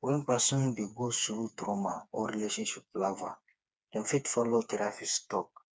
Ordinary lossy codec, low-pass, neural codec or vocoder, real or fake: none; none; codec, 16 kHz, 4 kbps, FreqCodec, smaller model; fake